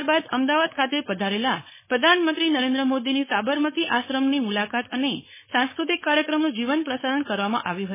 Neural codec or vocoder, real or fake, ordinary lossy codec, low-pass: codec, 16 kHz, 4.8 kbps, FACodec; fake; MP3, 16 kbps; 3.6 kHz